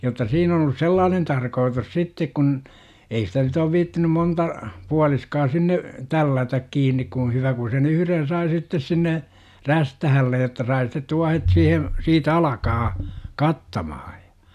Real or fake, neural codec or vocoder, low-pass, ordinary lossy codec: real; none; 14.4 kHz; none